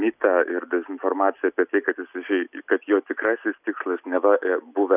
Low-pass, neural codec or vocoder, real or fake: 3.6 kHz; none; real